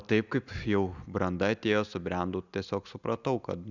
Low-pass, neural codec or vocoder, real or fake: 7.2 kHz; none; real